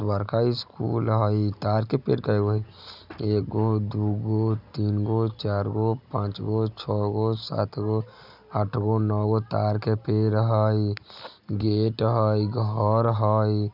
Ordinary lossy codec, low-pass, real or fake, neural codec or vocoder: none; 5.4 kHz; real; none